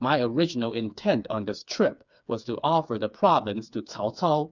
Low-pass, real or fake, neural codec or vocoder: 7.2 kHz; fake; codec, 16 kHz, 4 kbps, FreqCodec, smaller model